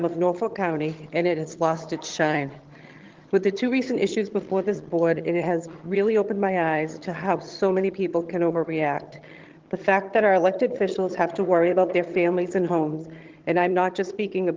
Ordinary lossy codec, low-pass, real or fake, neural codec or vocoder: Opus, 16 kbps; 7.2 kHz; fake; vocoder, 22.05 kHz, 80 mel bands, HiFi-GAN